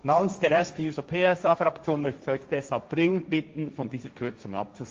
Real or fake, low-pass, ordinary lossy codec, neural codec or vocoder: fake; 7.2 kHz; Opus, 32 kbps; codec, 16 kHz, 1.1 kbps, Voila-Tokenizer